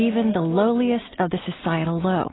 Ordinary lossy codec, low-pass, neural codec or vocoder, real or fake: AAC, 16 kbps; 7.2 kHz; none; real